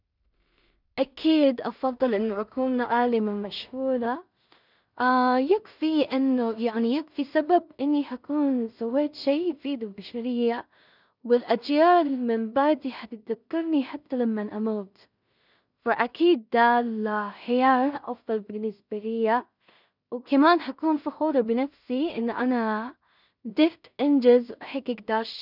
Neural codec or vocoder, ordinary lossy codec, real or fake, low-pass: codec, 16 kHz in and 24 kHz out, 0.4 kbps, LongCat-Audio-Codec, two codebook decoder; none; fake; 5.4 kHz